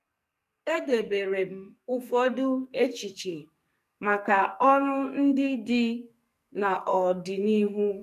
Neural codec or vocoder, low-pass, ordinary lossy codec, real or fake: codec, 44.1 kHz, 2.6 kbps, SNAC; 14.4 kHz; none; fake